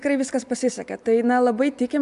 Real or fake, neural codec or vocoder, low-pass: real; none; 10.8 kHz